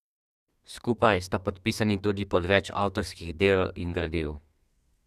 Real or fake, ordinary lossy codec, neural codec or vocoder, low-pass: fake; none; codec, 32 kHz, 1.9 kbps, SNAC; 14.4 kHz